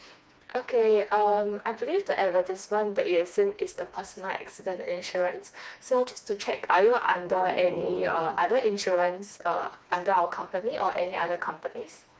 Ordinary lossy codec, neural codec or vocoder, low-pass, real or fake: none; codec, 16 kHz, 2 kbps, FreqCodec, smaller model; none; fake